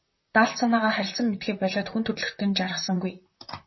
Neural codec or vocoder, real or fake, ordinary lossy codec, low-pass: vocoder, 44.1 kHz, 128 mel bands, Pupu-Vocoder; fake; MP3, 24 kbps; 7.2 kHz